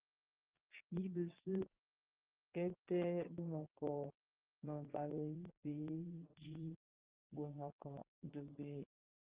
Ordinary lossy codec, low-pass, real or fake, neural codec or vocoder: Opus, 32 kbps; 3.6 kHz; fake; vocoder, 22.05 kHz, 80 mel bands, Vocos